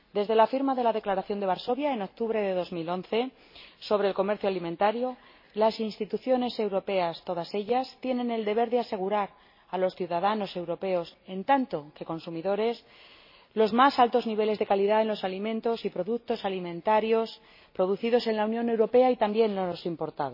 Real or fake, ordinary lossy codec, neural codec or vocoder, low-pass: real; MP3, 24 kbps; none; 5.4 kHz